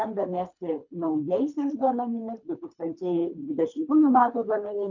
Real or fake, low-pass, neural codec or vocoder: fake; 7.2 kHz; codec, 24 kHz, 3 kbps, HILCodec